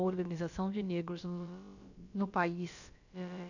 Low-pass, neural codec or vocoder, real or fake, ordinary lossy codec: 7.2 kHz; codec, 16 kHz, about 1 kbps, DyCAST, with the encoder's durations; fake; none